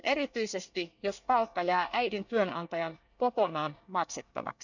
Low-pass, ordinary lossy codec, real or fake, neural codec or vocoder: 7.2 kHz; none; fake; codec, 24 kHz, 1 kbps, SNAC